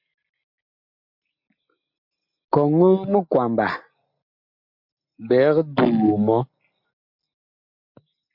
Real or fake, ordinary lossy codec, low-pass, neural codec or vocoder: real; MP3, 48 kbps; 5.4 kHz; none